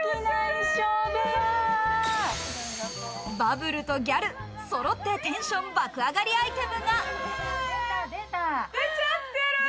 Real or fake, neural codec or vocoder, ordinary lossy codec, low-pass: real; none; none; none